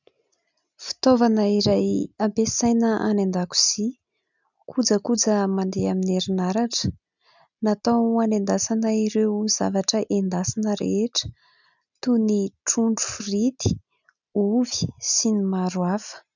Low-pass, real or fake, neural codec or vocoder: 7.2 kHz; real; none